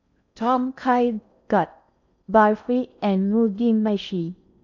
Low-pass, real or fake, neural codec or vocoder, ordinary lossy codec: 7.2 kHz; fake; codec, 16 kHz in and 24 kHz out, 0.6 kbps, FocalCodec, streaming, 4096 codes; none